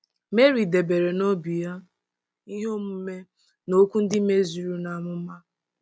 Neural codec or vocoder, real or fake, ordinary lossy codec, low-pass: none; real; none; none